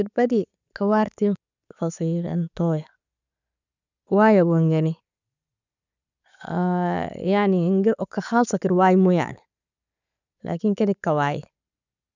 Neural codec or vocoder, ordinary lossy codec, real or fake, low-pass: none; none; real; 7.2 kHz